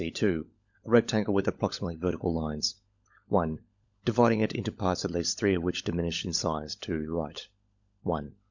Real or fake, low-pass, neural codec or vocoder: fake; 7.2 kHz; codec, 16 kHz, 16 kbps, FunCodec, trained on LibriTTS, 50 frames a second